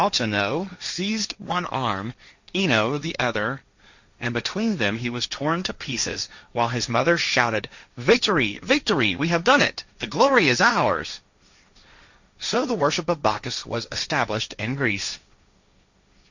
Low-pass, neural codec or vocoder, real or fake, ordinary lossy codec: 7.2 kHz; codec, 16 kHz, 1.1 kbps, Voila-Tokenizer; fake; Opus, 64 kbps